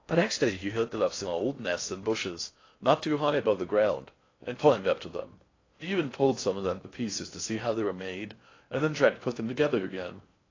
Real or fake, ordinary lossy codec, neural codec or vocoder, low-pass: fake; AAC, 32 kbps; codec, 16 kHz in and 24 kHz out, 0.6 kbps, FocalCodec, streaming, 4096 codes; 7.2 kHz